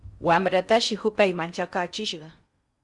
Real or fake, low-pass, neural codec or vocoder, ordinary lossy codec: fake; 10.8 kHz; codec, 16 kHz in and 24 kHz out, 0.6 kbps, FocalCodec, streaming, 4096 codes; Opus, 64 kbps